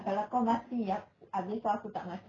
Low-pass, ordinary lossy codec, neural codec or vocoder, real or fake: 7.2 kHz; none; none; real